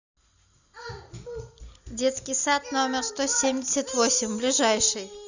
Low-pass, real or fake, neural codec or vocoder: 7.2 kHz; real; none